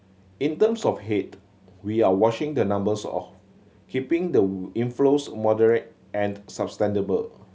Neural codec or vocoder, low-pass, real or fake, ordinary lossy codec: none; none; real; none